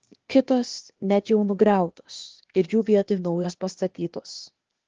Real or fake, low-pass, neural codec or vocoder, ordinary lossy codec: fake; 7.2 kHz; codec, 16 kHz, 0.8 kbps, ZipCodec; Opus, 16 kbps